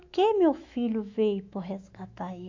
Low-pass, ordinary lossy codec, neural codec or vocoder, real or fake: 7.2 kHz; AAC, 48 kbps; autoencoder, 48 kHz, 128 numbers a frame, DAC-VAE, trained on Japanese speech; fake